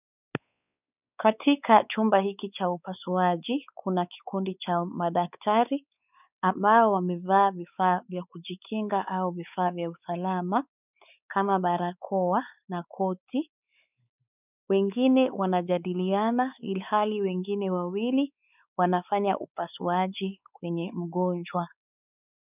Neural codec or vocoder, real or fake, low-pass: codec, 16 kHz, 4 kbps, X-Codec, WavLM features, trained on Multilingual LibriSpeech; fake; 3.6 kHz